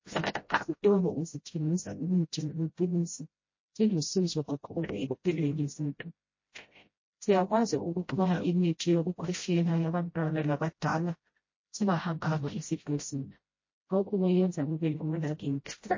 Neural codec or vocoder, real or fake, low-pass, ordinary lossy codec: codec, 16 kHz, 0.5 kbps, FreqCodec, smaller model; fake; 7.2 kHz; MP3, 32 kbps